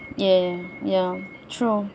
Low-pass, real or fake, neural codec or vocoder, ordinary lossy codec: none; real; none; none